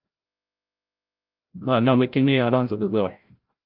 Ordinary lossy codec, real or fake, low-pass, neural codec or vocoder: Opus, 32 kbps; fake; 5.4 kHz; codec, 16 kHz, 0.5 kbps, FreqCodec, larger model